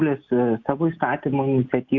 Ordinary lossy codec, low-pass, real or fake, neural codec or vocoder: Opus, 64 kbps; 7.2 kHz; real; none